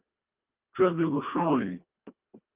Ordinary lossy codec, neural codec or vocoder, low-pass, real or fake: Opus, 24 kbps; codec, 24 kHz, 1.5 kbps, HILCodec; 3.6 kHz; fake